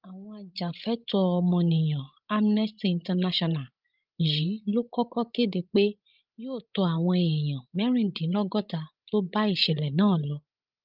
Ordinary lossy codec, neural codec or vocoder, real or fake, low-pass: Opus, 32 kbps; codec, 16 kHz, 16 kbps, FreqCodec, larger model; fake; 5.4 kHz